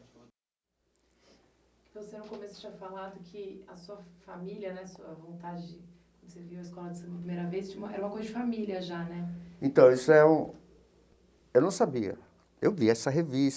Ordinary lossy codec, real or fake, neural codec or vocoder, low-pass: none; real; none; none